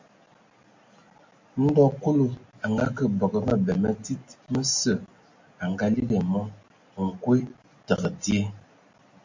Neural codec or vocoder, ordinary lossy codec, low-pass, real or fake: none; MP3, 48 kbps; 7.2 kHz; real